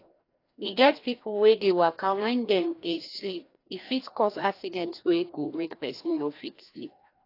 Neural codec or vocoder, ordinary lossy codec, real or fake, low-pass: codec, 16 kHz, 1 kbps, FreqCodec, larger model; AAC, 32 kbps; fake; 5.4 kHz